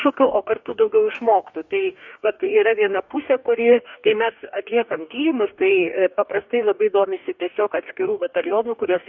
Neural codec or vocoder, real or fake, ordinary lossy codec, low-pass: codec, 44.1 kHz, 2.6 kbps, DAC; fake; MP3, 48 kbps; 7.2 kHz